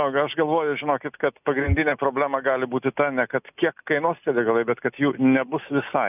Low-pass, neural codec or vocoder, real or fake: 3.6 kHz; none; real